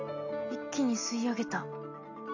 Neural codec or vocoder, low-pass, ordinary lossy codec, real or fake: none; 7.2 kHz; none; real